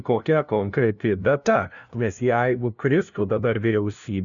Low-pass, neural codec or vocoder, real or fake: 7.2 kHz; codec, 16 kHz, 0.5 kbps, FunCodec, trained on LibriTTS, 25 frames a second; fake